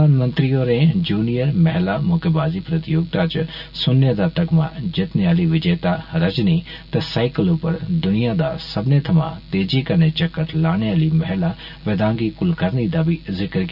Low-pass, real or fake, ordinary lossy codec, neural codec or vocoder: 5.4 kHz; real; none; none